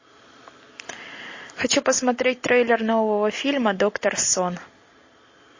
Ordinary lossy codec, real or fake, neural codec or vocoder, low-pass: MP3, 32 kbps; real; none; 7.2 kHz